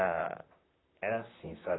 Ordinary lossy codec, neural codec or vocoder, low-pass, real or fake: AAC, 16 kbps; vocoder, 44.1 kHz, 128 mel bands, Pupu-Vocoder; 7.2 kHz; fake